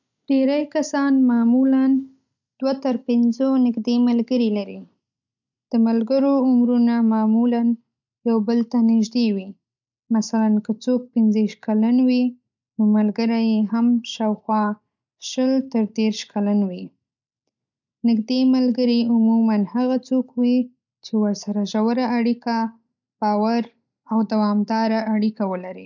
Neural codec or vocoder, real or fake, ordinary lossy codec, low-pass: none; real; none; 7.2 kHz